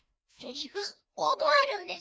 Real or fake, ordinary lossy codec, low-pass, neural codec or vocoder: fake; none; none; codec, 16 kHz, 1 kbps, FreqCodec, larger model